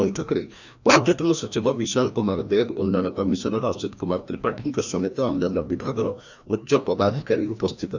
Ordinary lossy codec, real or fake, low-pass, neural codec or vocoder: none; fake; 7.2 kHz; codec, 16 kHz, 1 kbps, FreqCodec, larger model